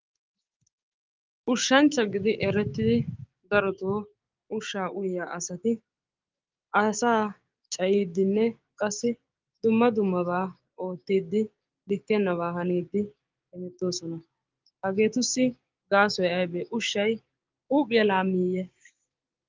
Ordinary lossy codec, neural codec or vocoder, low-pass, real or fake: Opus, 32 kbps; codec, 16 kHz, 6 kbps, DAC; 7.2 kHz; fake